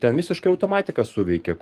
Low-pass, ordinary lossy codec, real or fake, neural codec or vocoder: 14.4 kHz; Opus, 24 kbps; fake; codec, 44.1 kHz, 7.8 kbps, DAC